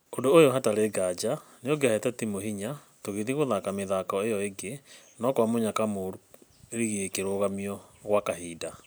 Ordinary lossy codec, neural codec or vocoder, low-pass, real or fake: none; none; none; real